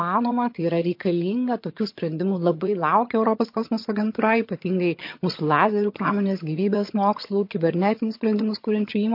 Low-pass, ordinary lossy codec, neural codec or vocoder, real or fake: 5.4 kHz; MP3, 32 kbps; vocoder, 22.05 kHz, 80 mel bands, HiFi-GAN; fake